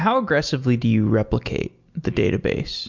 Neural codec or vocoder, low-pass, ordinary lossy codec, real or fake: none; 7.2 kHz; MP3, 64 kbps; real